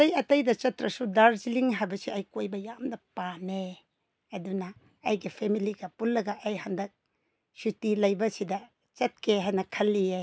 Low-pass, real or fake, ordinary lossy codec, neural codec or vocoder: none; real; none; none